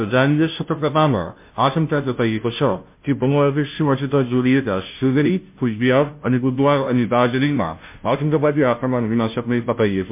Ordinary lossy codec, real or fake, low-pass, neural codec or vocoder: MP3, 32 kbps; fake; 3.6 kHz; codec, 16 kHz, 0.5 kbps, FunCodec, trained on Chinese and English, 25 frames a second